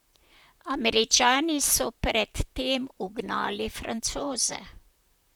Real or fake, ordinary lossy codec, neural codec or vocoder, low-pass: fake; none; vocoder, 44.1 kHz, 128 mel bands, Pupu-Vocoder; none